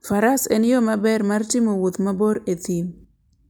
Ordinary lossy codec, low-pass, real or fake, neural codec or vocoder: none; none; real; none